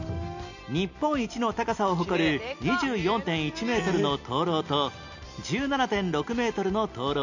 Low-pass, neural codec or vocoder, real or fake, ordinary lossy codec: 7.2 kHz; none; real; none